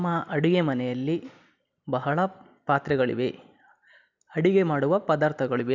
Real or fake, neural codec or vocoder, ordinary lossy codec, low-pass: real; none; none; 7.2 kHz